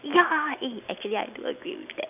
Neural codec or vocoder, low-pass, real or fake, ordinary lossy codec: none; 3.6 kHz; real; none